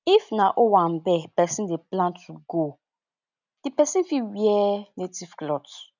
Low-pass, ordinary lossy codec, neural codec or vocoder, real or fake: 7.2 kHz; none; none; real